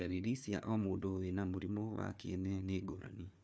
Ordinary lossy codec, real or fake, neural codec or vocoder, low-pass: none; fake; codec, 16 kHz, 4 kbps, FunCodec, trained on Chinese and English, 50 frames a second; none